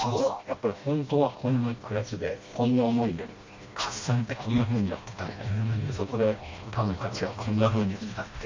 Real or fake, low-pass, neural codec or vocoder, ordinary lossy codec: fake; 7.2 kHz; codec, 16 kHz, 1 kbps, FreqCodec, smaller model; MP3, 48 kbps